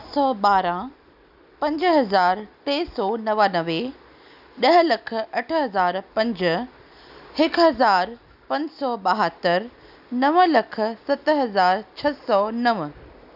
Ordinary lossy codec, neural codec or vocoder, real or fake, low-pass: none; none; real; 5.4 kHz